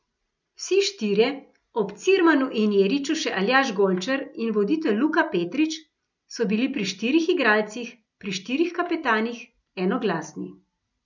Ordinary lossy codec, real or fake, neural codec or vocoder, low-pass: none; real; none; 7.2 kHz